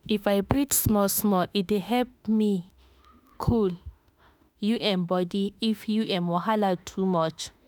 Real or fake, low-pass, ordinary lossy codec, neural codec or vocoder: fake; none; none; autoencoder, 48 kHz, 32 numbers a frame, DAC-VAE, trained on Japanese speech